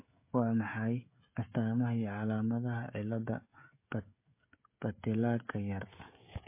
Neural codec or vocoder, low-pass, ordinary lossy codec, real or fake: codec, 16 kHz, 16 kbps, FreqCodec, smaller model; 3.6 kHz; MP3, 24 kbps; fake